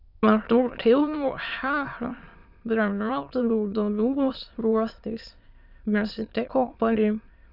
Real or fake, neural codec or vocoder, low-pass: fake; autoencoder, 22.05 kHz, a latent of 192 numbers a frame, VITS, trained on many speakers; 5.4 kHz